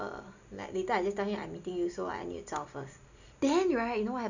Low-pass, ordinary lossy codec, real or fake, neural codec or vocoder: 7.2 kHz; none; real; none